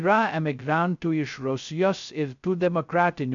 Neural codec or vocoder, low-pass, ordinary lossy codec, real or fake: codec, 16 kHz, 0.2 kbps, FocalCodec; 7.2 kHz; AAC, 64 kbps; fake